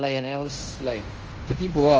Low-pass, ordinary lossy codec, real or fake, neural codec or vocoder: 7.2 kHz; Opus, 24 kbps; fake; codec, 16 kHz in and 24 kHz out, 0.9 kbps, LongCat-Audio-Codec, fine tuned four codebook decoder